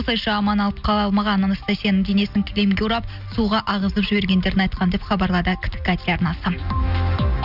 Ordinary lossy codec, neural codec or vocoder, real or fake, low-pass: none; none; real; 5.4 kHz